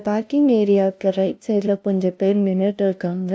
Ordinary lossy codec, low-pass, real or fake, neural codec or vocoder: none; none; fake; codec, 16 kHz, 0.5 kbps, FunCodec, trained on LibriTTS, 25 frames a second